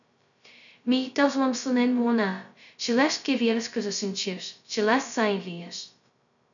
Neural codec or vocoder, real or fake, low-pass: codec, 16 kHz, 0.2 kbps, FocalCodec; fake; 7.2 kHz